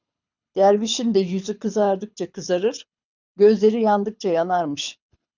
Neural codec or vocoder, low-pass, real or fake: codec, 24 kHz, 6 kbps, HILCodec; 7.2 kHz; fake